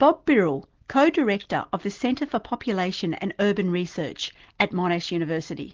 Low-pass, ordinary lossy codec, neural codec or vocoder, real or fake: 7.2 kHz; Opus, 16 kbps; none; real